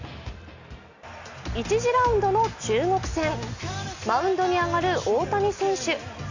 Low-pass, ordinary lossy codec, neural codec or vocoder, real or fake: 7.2 kHz; Opus, 64 kbps; none; real